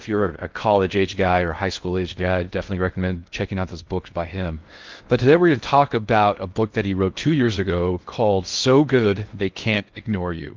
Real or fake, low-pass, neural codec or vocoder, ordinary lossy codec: fake; 7.2 kHz; codec, 16 kHz in and 24 kHz out, 0.6 kbps, FocalCodec, streaming, 4096 codes; Opus, 32 kbps